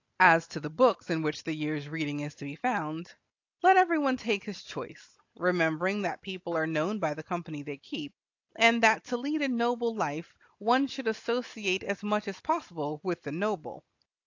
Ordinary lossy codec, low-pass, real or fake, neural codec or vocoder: AAC, 48 kbps; 7.2 kHz; real; none